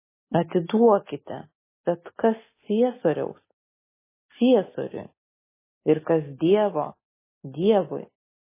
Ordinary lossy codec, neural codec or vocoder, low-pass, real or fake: MP3, 16 kbps; none; 3.6 kHz; real